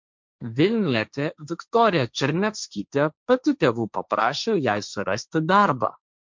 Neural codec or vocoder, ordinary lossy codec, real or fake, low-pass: codec, 16 kHz, 1.1 kbps, Voila-Tokenizer; MP3, 64 kbps; fake; 7.2 kHz